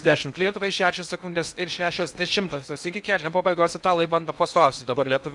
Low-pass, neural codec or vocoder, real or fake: 10.8 kHz; codec, 16 kHz in and 24 kHz out, 0.8 kbps, FocalCodec, streaming, 65536 codes; fake